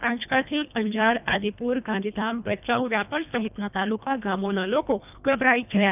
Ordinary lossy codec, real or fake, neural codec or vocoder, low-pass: none; fake; codec, 24 kHz, 1.5 kbps, HILCodec; 3.6 kHz